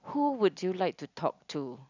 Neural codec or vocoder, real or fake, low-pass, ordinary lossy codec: none; real; 7.2 kHz; none